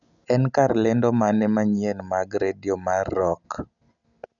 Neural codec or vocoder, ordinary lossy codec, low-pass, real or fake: none; none; 7.2 kHz; real